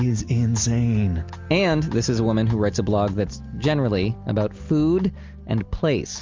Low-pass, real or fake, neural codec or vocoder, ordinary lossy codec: 7.2 kHz; real; none; Opus, 32 kbps